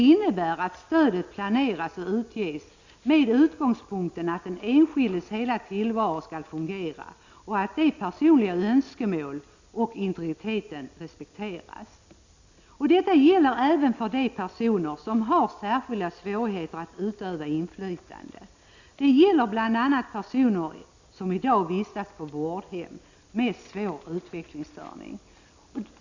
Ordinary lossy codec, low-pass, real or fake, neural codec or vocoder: Opus, 64 kbps; 7.2 kHz; real; none